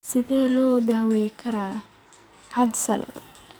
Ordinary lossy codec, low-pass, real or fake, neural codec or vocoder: none; none; fake; codec, 44.1 kHz, 2.6 kbps, SNAC